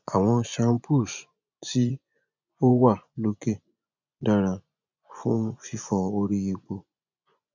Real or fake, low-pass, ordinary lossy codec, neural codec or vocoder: fake; 7.2 kHz; none; vocoder, 24 kHz, 100 mel bands, Vocos